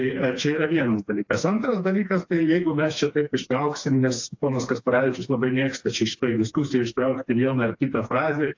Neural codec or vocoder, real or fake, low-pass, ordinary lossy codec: codec, 16 kHz, 2 kbps, FreqCodec, smaller model; fake; 7.2 kHz; AAC, 48 kbps